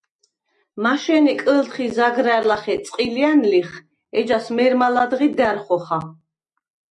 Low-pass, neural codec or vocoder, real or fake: 10.8 kHz; none; real